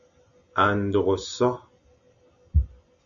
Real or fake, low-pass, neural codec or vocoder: real; 7.2 kHz; none